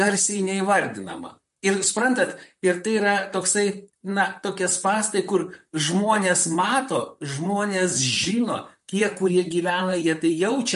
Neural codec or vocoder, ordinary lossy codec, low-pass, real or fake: vocoder, 44.1 kHz, 128 mel bands, Pupu-Vocoder; MP3, 48 kbps; 14.4 kHz; fake